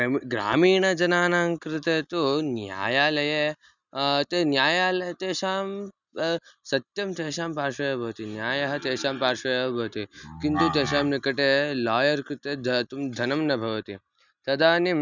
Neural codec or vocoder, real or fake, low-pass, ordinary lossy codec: none; real; 7.2 kHz; none